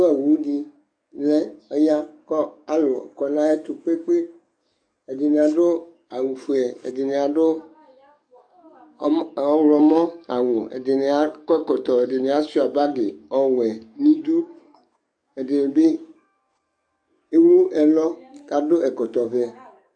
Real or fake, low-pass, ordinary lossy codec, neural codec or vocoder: fake; 9.9 kHz; AAC, 64 kbps; codec, 44.1 kHz, 7.8 kbps, DAC